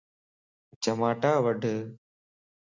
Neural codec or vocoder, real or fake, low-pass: none; real; 7.2 kHz